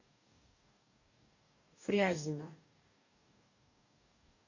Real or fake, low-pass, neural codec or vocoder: fake; 7.2 kHz; codec, 44.1 kHz, 2.6 kbps, DAC